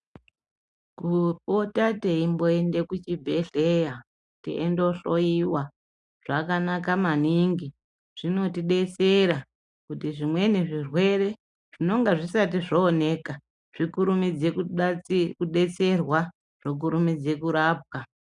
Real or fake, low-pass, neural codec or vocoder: real; 10.8 kHz; none